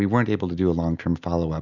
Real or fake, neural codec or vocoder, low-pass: real; none; 7.2 kHz